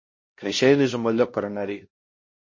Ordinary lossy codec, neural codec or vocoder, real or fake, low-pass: MP3, 32 kbps; codec, 16 kHz, 1 kbps, X-Codec, HuBERT features, trained on LibriSpeech; fake; 7.2 kHz